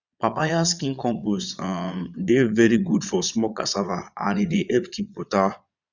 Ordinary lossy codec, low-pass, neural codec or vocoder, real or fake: none; 7.2 kHz; vocoder, 22.05 kHz, 80 mel bands, Vocos; fake